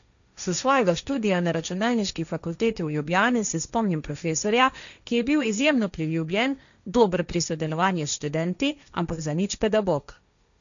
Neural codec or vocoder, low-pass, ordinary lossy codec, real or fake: codec, 16 kHz, 1.1 kbps, Voila-Tokenizer; 7.2 kHz; none; fake